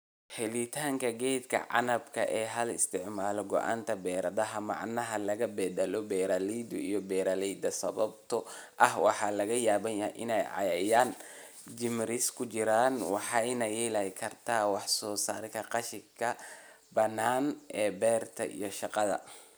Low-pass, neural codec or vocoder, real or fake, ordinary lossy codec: none; none; real; none